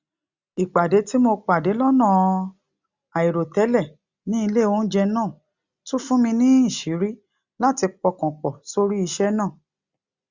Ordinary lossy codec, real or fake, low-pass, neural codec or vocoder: Opus, 64 kbps; real; 7.2 kHz; none